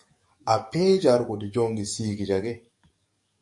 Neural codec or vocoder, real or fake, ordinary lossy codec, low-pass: codec, 44.1 kHz, 7.8 kbps, DAC; fake; MP3, 48 kbps; 10.8 kHz